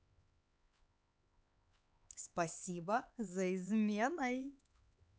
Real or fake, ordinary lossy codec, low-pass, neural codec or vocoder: fake; none; none; codec, 16 kHz, 4 kbps, X-Codec, HuBERT features, trained on LibriSpeech